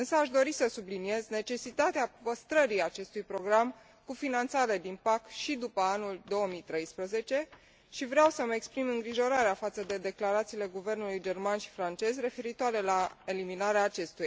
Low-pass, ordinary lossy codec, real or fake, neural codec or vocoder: none; none; real; none